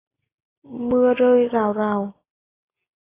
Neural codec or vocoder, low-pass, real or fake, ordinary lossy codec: none; 3.6 kHz; real; AAC, 16 kbps